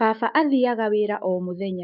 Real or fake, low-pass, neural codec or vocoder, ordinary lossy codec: fake; 5.4 kHz; autoencoder, 48 kHz, 128 numbers a frame, DAC-VAE, trained on Japanese speech; none